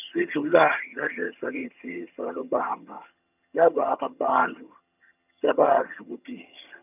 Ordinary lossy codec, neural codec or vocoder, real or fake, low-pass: none; vocoder, 22.05 kHz, 80 mel bands, HiFi-GAN; fake; 3.6 kHz